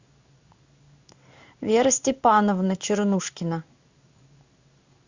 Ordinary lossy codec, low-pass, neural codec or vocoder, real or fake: Opus, 64 kbps; 7.2 kHz; codec, 16 kHz in and 24 kHz out, 1 kbps, XY-Tokenizer; fake